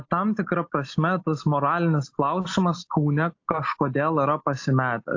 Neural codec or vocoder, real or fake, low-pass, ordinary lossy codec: none; real; 7.2 kHz; AAC, 48 kbps